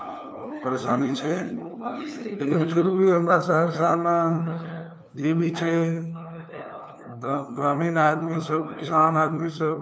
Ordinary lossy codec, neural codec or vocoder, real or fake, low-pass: none; codec, 16 kHz, 2 kbps, FunCodec, trained on LibriTTS, 25 frames a second; fake; none